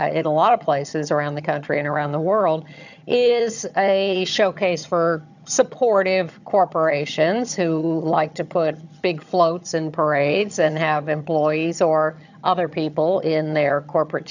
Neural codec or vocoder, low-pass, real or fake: vocoder, 22.05 kHz, 80 mel bands, HiFi-GAN; 7.2 kHz; fake